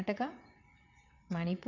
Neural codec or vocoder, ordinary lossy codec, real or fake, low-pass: vocoder, 22.05 kHz, 80 mel bands, WaveNeXt; none; fake; 7.2 kHz